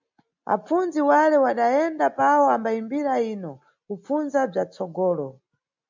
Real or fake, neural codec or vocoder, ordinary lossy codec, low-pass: real; none; MP3, 64 kbps; 7.2 kHz